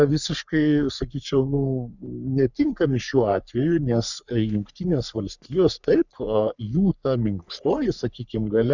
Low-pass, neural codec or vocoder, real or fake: 7.2 kHz; codec, 44.1 kHz, 3.4 kbps, Pupu-Codec; fake